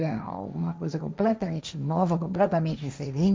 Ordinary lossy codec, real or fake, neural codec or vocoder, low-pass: none; fake; codec, 16 kHz, 1.1 kbps, Voila-Tokenizer; none